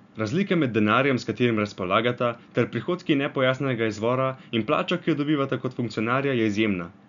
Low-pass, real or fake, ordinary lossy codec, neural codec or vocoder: 7.2 kHz; real; none; none